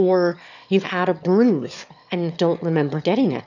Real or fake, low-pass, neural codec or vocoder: fake; 7.2 kHz; autoencoder, 22.05 kHz, a latent of 192 numbers a frame, VITS, trained on one speaker